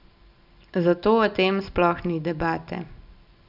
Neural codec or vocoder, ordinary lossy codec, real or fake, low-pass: none; none; real; 5.4 kHz